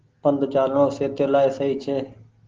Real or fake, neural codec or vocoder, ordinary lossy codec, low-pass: real; none; Opus, 16 kbps; 7.2 kHz